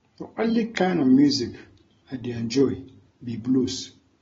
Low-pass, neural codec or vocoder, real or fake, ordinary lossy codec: 7.2 kHz; none; real; AAC, 24 kbps